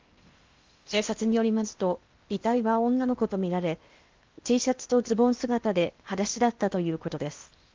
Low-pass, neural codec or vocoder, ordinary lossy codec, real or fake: 7.2 kHz; codec, 16 kHz in and 24 kHz out, 0.8 kbps, FocalCodec, streaming, 65536 codes; Opus, 32 kbps; fake